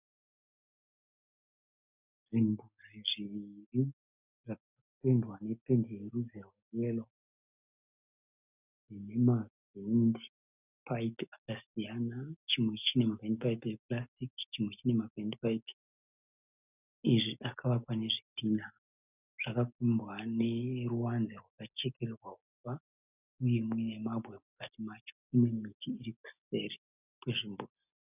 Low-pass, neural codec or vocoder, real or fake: 3.6 kHz; none; real